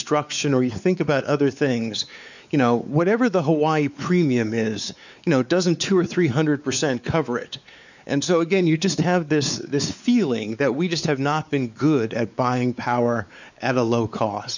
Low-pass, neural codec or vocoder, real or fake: 7.2 kHz; codec, 16 kHz, 4 kbps, X-Codec, WavLM features, trained on Multilingual LibriSpeech; fake